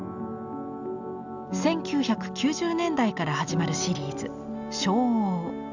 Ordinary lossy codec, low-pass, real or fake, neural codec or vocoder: none; 7.2 kHz; real; none